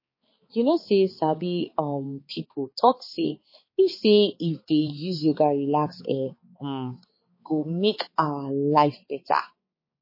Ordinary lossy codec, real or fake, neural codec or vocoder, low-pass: MP3, 24 kbps; fake; codec, 16 kHz, 4 kbps, X-Codec, HuBERT features, trained on balanced general audio; 5.4 kHz